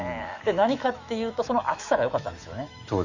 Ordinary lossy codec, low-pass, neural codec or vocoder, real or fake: Opus, 64 kbps; 7.2 kHz; autoencoder, 48 kHz, 128 numbers a frame, DAC-VAE, trained on Japanese speech; fake